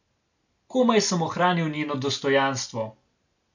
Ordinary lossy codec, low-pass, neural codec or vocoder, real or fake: none; 7.2 kHz; none; real